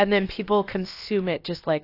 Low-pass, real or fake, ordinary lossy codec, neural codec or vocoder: 5.4 kHz; fake; AAC, 32 kbps; codec, 16 kHz, about 1 kbps, DyCAST, with the encoder's durations